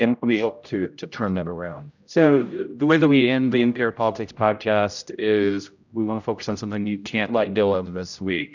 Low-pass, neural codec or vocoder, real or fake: 7.2 kHz; codec, 16 kHz, 0.5 kbps, X-Codec, HuBERT features, trained on general audio; fake